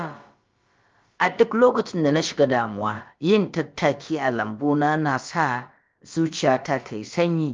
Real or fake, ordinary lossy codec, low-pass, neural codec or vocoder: fake; Opus, 24 kbps; 7.2 kHz; codec, 16 kHz, about 1 kbps, DyCAST, with the encoder's durations